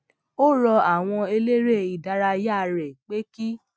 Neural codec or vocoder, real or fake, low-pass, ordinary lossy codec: none; real; none; none